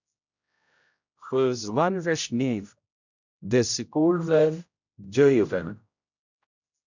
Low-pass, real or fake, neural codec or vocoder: 7.2 kHz; fake; codec, 16 kHz, 0.5 kbps, X-Codec, HuBERT features, trained on general audio